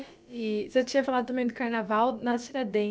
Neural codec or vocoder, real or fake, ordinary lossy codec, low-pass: codec, 16 kHz, about 1 kbps, DyCAST, with the encoder's durations; fake; none; none